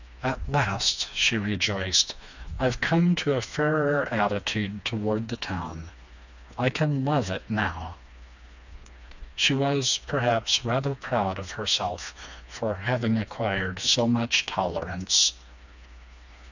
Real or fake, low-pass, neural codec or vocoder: fake; 7.2 kHz; codec, 16 kHz, 2 kbps, FreqCodec, smaller model